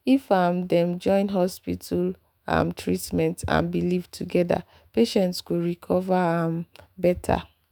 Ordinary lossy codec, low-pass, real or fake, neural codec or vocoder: none; 19.8 kHz; fake; autoencoder, 48 kHz, 128 numbers a frame, DAC-VAE, trained on Japanese speech